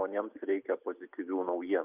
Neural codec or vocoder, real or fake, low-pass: none; real; 3.6 kHz